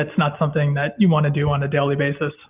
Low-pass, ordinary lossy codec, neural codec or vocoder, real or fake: 3.6 kHz; Opus, 24 kbps; none; real